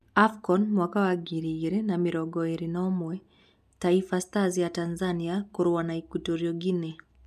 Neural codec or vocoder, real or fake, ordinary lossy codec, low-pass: none; real; none; 14.4 kHz